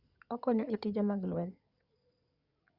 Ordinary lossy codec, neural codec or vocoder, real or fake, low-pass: Opus, 64 kbps; codec, 16 kHz in and 24 kHz out, 2.2 kbps, FireRedTTS-2 codec; fake; 5.4 kHz